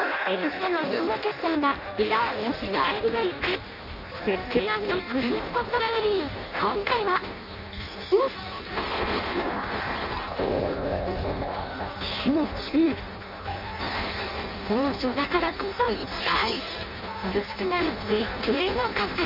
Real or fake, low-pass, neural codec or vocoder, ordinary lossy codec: fake; 5.4 kHz; codec, 16 kHz in and 24 kHz out, 0.6 kbps, FireRedTTS-2 codec; none